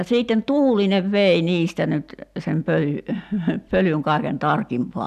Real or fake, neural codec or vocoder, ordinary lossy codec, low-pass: real; none; none; 14.4 kHz